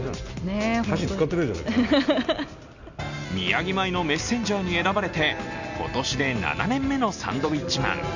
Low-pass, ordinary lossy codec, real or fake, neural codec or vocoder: 7.2 kHz; none; real; none